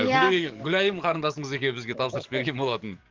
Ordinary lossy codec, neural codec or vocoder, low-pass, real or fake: Opus, 24 kbps; codec, 44.1 kHz, 7.8 kbps, DAC; 7.2 kHz; fake